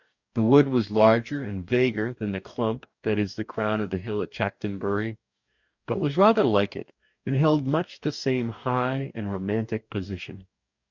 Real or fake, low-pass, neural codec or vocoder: fake; 7.2 kHz; codec, 44.1 kHz, 2.6 kbps, DAC